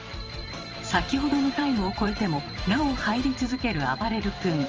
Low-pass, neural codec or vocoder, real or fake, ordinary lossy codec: 7.2 kHz; vocoder, 44.1 kHz, 128 mel bands every 512 samples, BigVGAN v2; fake; Opus, 24 kbps